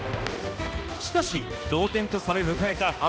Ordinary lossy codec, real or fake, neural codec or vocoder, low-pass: none; fake; codec, 16 kHz, 1 kbps, X-Codec, HuBERT features, trained on balanced general audio; none